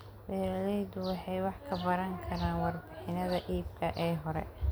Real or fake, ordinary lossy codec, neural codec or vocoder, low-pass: real; none; none; none